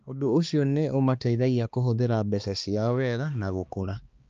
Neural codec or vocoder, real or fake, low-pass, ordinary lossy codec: codec, 16 kHz, 2 kbps, X-Codec, HuBERT features, trained on balanced general audio; fake; 7.2 kHz; Opus, 24 kbps